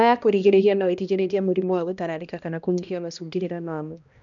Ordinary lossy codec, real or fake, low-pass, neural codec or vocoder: none; fake; 7.2 kHz; codec, 16 kHz, 1 kbps, X-Codec, HuBERT features, trained on balanced general audio